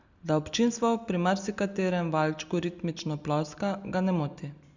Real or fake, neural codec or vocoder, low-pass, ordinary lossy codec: real; none; 7.2 kHz; Opus, 64 kbps